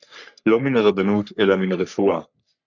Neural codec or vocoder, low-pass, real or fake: codec, 44.1 kHz, 3.4 kbps, Pupu-Codec; 7.2 kHz; fake